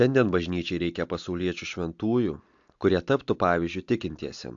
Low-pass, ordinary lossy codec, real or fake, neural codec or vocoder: 7.2 kHz; AAC, 64 kbps; real; none